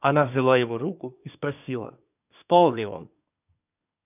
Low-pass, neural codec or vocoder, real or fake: 3.6 kHz; codec, 24 kHz, 1 kbps, SNAC; fake